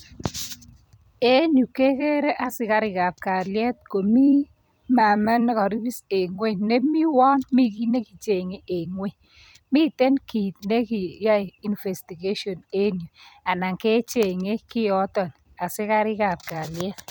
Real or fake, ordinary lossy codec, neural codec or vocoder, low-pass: fake; none; vocoder, 44.1 kHz, 128 mel bands every 256 samples, BigVGAN v2; none